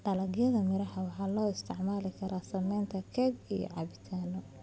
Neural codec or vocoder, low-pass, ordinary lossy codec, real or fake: none; none; none; real